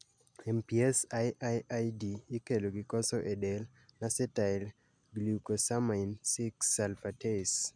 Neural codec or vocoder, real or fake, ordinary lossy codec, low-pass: none; real; MP3, 96 kbps; 9.9 kHz